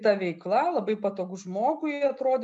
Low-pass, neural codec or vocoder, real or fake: 10.8 kHz; none; real